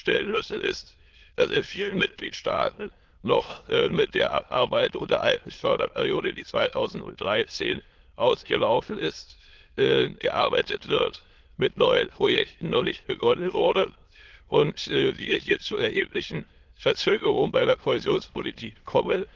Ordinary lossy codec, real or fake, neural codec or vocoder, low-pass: Opus, 16 kbps; fake; autoencoder, 22.05 kHz, a latent of 192 numbers a frame, VITS, trained on many speakers; 7.2 kHz